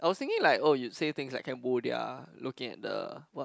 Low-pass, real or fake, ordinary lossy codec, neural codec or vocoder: none; real; none; none